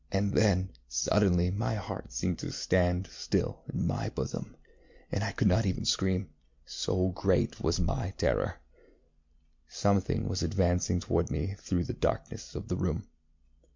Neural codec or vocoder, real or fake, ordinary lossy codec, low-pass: none; real; MP3, 64 kbps; 7.2 kHz